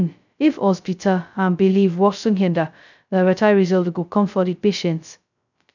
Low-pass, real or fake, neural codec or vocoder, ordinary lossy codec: 7.2 kHz; fake; codec, 16 kHz, 0.2 kbps, FocalCodec; none